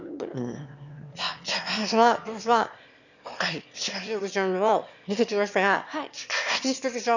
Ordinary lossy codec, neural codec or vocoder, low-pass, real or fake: none; autoencoder, 22.05 kHz, a latent of 192 numbers a frame, VITS, trained on one speaker; 7.2 kHz; fake